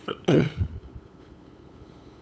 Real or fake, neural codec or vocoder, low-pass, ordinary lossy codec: fake; codec, 16 kHz, 8 kbps, FunCodec, trained on LibriTTS, 25 frames a second; none; none